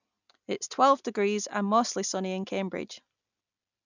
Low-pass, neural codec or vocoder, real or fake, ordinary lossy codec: 7.2 kHz; none; real; none